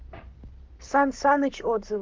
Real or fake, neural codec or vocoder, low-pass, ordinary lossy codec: fake; codec, 44.1 kHz, 7.8 kbps, Pupu-Codec; 7.2 kHz; Opus, 24 kbps